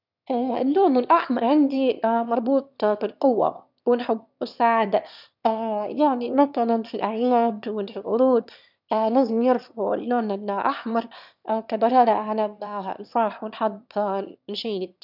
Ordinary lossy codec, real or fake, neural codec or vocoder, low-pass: none; fake; autoencoder, 22.05 kHz, a latent of 192 numbers a frame, VITS, trained on one speaker; 5.4 kHz